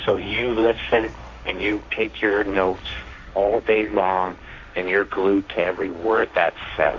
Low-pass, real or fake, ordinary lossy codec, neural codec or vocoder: 7.2 kHz; fake; MP3, 48 kbps; codec, 16 kHz, 1.1 kbps, Voila-Tokenizer